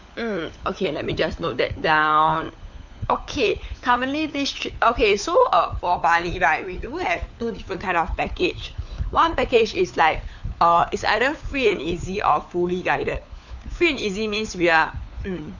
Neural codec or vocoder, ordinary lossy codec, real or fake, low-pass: codec, 16 kHz, 4 kbps, FunCodec, trained on LibriTTS, 50 frames a second; none; fake; 7.2 kHz